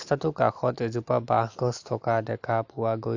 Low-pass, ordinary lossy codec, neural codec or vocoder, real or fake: 7.2 kHz; AAC, 48 kbps; none; real